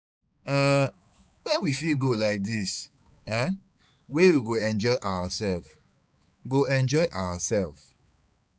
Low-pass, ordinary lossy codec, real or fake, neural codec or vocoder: none; none; fake; codec, 16 kHz, 4 kbps, X-Codec, HuBERT features, trained on balanced general audio